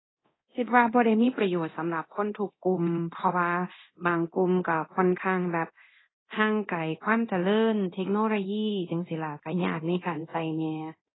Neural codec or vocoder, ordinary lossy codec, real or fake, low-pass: codec, 24 kHz, 0.9 kbps, DualCodec; AAC, 16 kbps; fake; 7.2 kHz